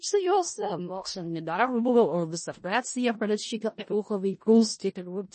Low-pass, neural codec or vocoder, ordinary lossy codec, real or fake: 10.8 kHz; codec, 16 kHz in and 24 kHz out, 0.4 kbps, LongCat-Audio-Codec, four codebook decoder; MP3, 32 kbps; fake